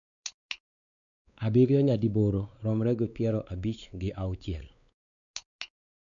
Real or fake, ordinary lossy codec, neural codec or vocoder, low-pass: fake; none; codec, 16 kHz, 4 kbps, X-Codec, WavLM features, trained on Multilingual LibriSpeech; 7.2 kHz